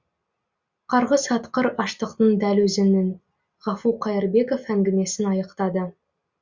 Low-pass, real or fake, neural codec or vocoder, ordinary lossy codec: 7.2 kHz; real; none; Opus, 64 kbps